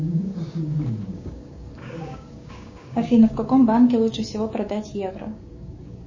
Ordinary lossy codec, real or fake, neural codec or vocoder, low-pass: MP3, 32 kbps; fake; codec, 16 kHz, 6 kbps, DAC; 7.2 kHz